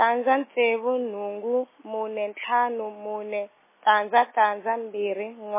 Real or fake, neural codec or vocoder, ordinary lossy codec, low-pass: real; none; MP3, 16 kbps; 3.6 kHz